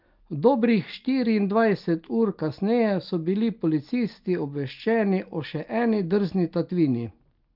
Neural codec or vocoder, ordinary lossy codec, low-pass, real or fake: none; Opus, 24 kbps; 5.4 kHz; real